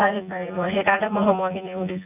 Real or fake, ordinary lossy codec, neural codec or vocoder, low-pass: fake; none; vocoder, 24 kHz, 100 mel bands, Vocos; 3.6 kHz